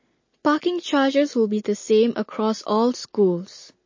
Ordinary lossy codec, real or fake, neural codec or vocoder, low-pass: MP3, 32 kbps; real; none; 7.2 kHz